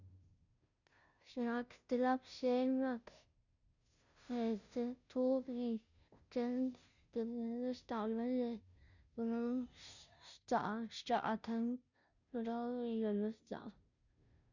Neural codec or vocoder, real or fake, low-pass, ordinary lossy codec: codec, 16 kHz, 0.5 kbps, FunCodec, trained on Chinese and English, 25 frames a second; fake; 7.2 kHz; none